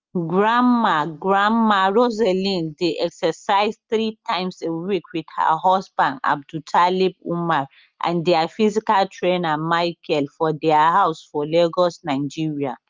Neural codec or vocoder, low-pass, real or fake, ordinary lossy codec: none; 7.2 kHz; real; Opus, 32 kbps